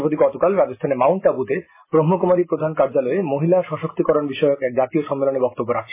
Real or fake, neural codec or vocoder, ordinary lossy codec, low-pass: real; none; MP3, 16 kbps; 3.6 kHz